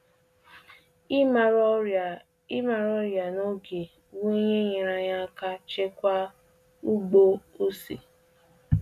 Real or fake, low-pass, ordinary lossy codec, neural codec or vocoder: real; 14.4 kHz; none; none